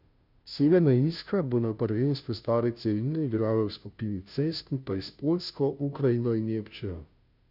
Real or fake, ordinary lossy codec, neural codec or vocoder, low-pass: fake; none; codec, 16 kHz, 0.5 kbps, FunCodec, trained on Chinese and English, 25 frames a second; 5.4 kHz